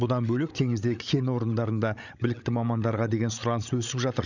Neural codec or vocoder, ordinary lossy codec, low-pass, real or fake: codec, 16 kHz, 16 kbps, FreqCodec, larger model; none; 7.2 kHz; fake